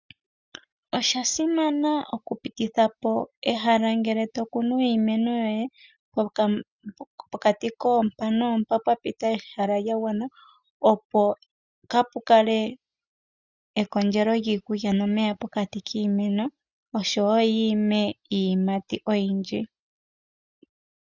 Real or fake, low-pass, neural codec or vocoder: real; 7.2 kHz; none